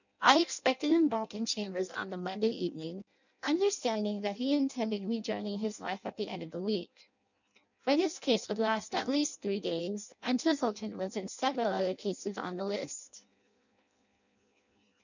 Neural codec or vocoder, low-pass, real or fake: codec, 16 kHz in and 24 kHz out, 0.6 kbps, FireRedTTS-2 codec; 7.2 kHz; fake